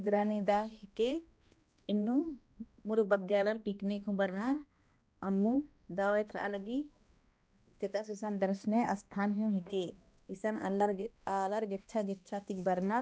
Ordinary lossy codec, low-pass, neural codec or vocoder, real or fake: none; none; codec, 16 kHz, 1 kbps, X-Codec, HuBERT features, trained on balanced general audio; fake